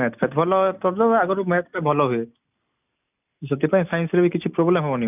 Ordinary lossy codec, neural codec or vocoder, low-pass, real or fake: none; none; 3.6 kHz; real